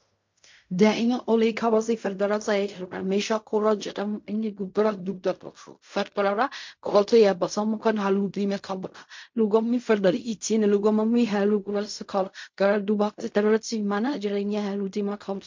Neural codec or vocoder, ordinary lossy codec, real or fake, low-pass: codec, 16 kHz in and 24 kHz out, 0.4 kbps, LongCat-Audio-Codec, fine tuned four codebook decoder; MP3, 48 kbps; fake; 7.2 kHz